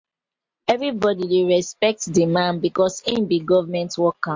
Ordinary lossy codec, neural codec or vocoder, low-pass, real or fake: MP3, 48 kbps; none; 7.2 kHz; real